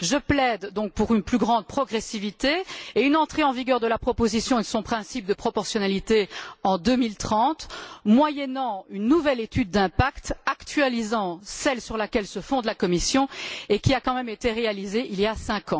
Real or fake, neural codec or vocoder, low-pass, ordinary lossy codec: real; none; none; none